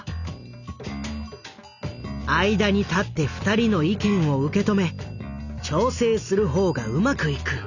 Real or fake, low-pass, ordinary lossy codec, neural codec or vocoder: real; 7.2 kHz; none; none